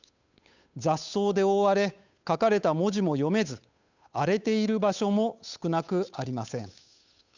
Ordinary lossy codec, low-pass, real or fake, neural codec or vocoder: none; 7.2 kHz; fake; codec, 16 kHz, 8 kbps, FunCodec, trained on Chinese and English, 25 frames a second